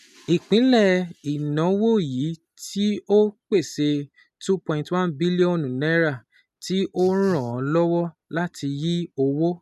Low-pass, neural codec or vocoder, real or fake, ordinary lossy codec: 14.4 kHz; none; real; none